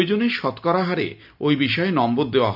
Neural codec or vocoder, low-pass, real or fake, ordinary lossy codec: none; 5.4 kHz; real; none